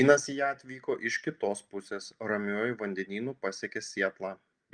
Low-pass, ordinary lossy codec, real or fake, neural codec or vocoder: 9.9 kHz; Opus, 32 kbps; real; none